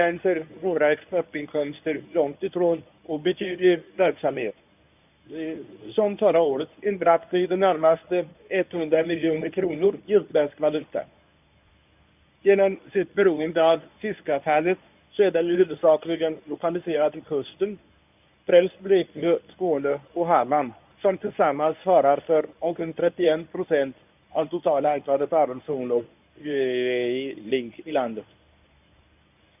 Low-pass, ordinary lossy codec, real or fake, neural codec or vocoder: 3.6 kHz; none; fake; codec, 24 kHz, 0.9 kbps, WavTokenizer, medium speech release version 1